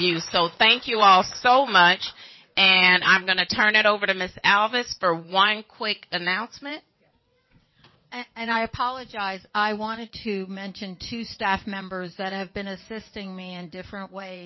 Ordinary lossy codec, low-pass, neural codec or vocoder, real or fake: MP3, 24 kbps; 7.2 kHz; vocoder, 22.05 kHz, 80 mel bands, WaveNeXt; fake